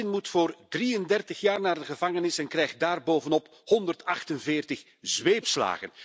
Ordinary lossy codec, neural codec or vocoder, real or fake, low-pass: none; none; real; none